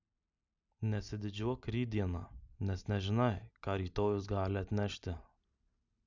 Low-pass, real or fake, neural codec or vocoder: 7.2 kHz; real; none